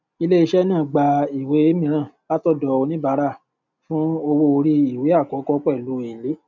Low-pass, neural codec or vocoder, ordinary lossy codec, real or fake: 7.2 kHz; none; none; real